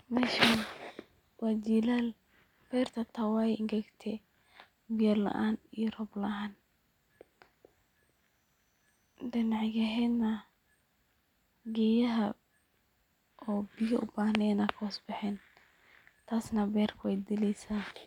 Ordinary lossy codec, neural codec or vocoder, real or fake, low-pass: none; none; real; 19.8 kHz